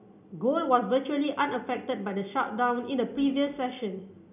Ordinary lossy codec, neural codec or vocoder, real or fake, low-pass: none; none; real; 3.6 kHz